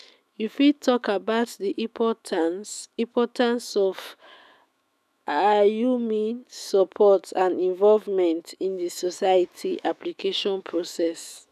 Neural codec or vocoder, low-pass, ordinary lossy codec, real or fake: autoencoder, 48 kHz, 128 numbers a frame, DAC-VAE, trained on Japanese speech; 14.4 kHz; none; fake